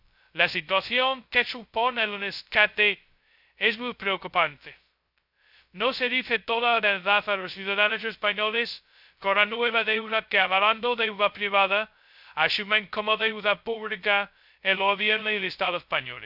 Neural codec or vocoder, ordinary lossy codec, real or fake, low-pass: codec, 16 kHz, 0.2 kbps, FocalCodec; none; fake; 5.4 kHz